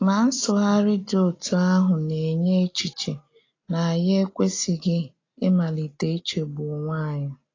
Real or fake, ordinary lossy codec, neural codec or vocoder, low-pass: real; AAC, 32 kbps; none; 7.2 kHz